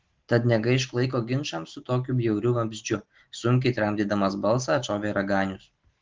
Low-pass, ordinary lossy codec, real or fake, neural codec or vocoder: 7.2 kHz; Opus, 16 kbps; real; none